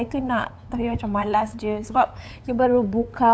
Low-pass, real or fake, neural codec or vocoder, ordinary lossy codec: none; fake; codec, 16 kHz, 8 kbps, FunCodec, trained on LibriTTS, 25 frames a second; none